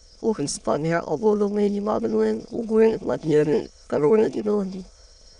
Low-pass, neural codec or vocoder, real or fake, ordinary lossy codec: 9.9 kHz; autoencoder, 22.05 kHz, a latent of 192 numbers a frame, VITS, trained on many speakers; fake; none